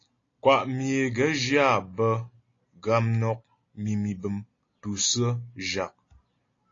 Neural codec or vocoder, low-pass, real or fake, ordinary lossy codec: none; 7.2 kHz; real; AAC, 32 kbps